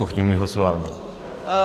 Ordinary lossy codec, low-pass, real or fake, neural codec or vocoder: AAC, 96 kbps; 14.4 kHz; fake; codec, 44.1 kHz, 2.6 kbps, SNAC